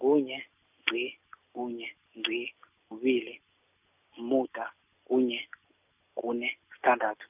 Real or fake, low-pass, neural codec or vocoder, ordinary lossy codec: real; 3.6 kHz; none; none